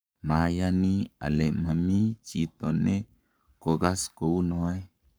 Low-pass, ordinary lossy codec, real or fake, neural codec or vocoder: none; none; fake; codec, 44.1 kHz, 7.8 kbps, Pupu-Codec